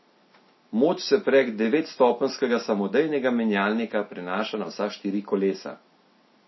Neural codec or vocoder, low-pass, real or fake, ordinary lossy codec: none; 7.2 kHz; real; MP3, 24 kbps